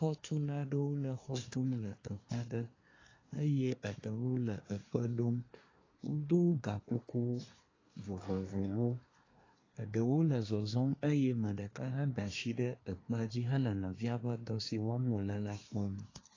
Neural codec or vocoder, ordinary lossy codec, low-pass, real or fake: codec, 24 kHz, 1 kbps, SNAC; AAC, 32 kbps; 7.2 kHz; fake